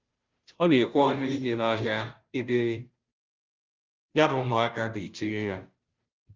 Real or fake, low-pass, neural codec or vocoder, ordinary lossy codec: fake; 7.2 kHz; codec, 16 kHz, 0.5 kbps, FunCodec, trained on Chinese and English, 25 frames a second; Opus, 32 kbps